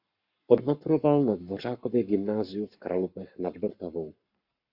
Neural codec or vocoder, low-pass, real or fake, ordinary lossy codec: codec, 44.1 kHz, 7.8 kbps, Pupu-Codec; 5.4 kHz; fake; Opus, 64 kbps